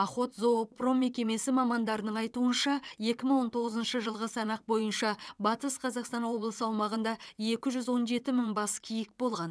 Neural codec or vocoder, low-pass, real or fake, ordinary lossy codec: vocoder, 22.05 kHz, 80 mel bands, WaveNeXt; none; fake; none